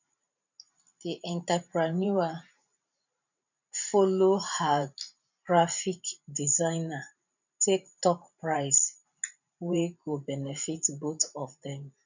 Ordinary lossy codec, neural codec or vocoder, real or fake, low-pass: none; vocoder, 44.1 kHz, 128 mel bands every 512 samples, BigVGAN v2; fake; 7.2 kHz